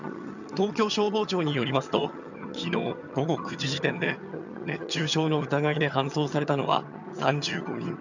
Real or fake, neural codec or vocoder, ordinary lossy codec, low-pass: fake; vocoder, 22.05 kHz, 80 mel bands, HiFi-GAN; none; 7.2 kHz